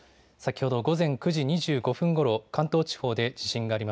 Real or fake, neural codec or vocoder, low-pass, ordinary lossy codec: real; none; none; none